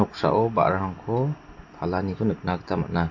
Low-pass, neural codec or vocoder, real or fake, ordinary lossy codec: 7.2 kHz; none; real; AAC, 48 kbps